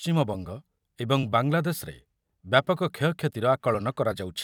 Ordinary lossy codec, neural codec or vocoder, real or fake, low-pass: none; vocoder, 44.1 kHz, 128 mel bands every 256 samples, BigVGAN v2; fake; 19.8 kHz